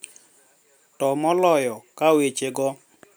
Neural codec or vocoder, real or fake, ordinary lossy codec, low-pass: none; real; none; none